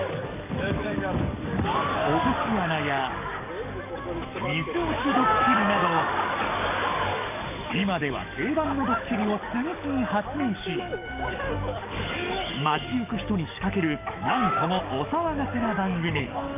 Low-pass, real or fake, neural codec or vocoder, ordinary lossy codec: 3.6 kHz; fake; codec, 44.1 kHz, 7.8 kbps, DAC; none